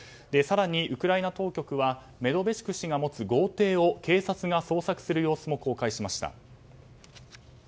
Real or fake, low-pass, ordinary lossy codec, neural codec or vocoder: real; none; none; none